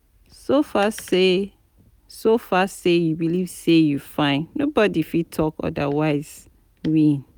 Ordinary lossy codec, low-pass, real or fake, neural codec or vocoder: none; none; real; none